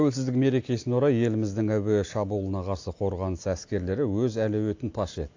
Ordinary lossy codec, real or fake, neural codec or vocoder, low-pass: AAC, 48 kbps; real; none; 7.2 kHz